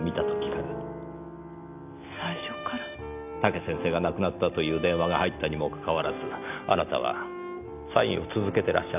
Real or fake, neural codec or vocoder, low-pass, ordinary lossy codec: real; none; 3.6 kHz; none